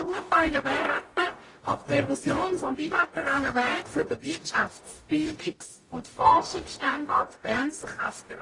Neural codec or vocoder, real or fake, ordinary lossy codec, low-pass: codec, 44.1 kHz, 0.9 kbps, DAC; fake; AAC, 32 kbps; 10.8 kHz